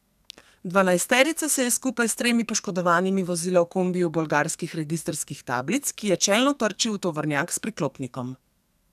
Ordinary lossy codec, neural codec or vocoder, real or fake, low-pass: none; codec, 44.1 kHz, 2.6 kbps, SNAC; fake; 14.4 kHz